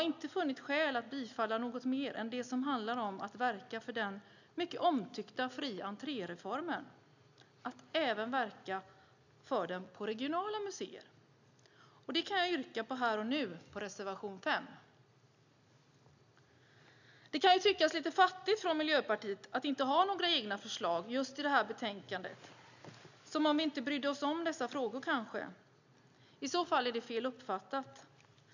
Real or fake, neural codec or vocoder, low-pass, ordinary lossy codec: real; none; 7.2 kHz; none